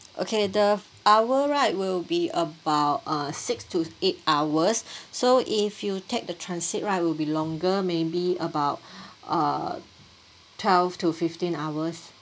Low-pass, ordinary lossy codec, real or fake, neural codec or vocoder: none; none; real; none